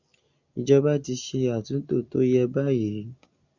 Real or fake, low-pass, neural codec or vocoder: real; 7.2 kHz; none